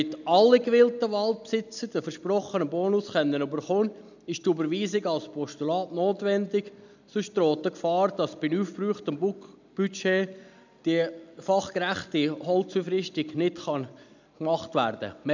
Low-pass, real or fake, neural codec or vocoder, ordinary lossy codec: 7.2 kHz; real; none; none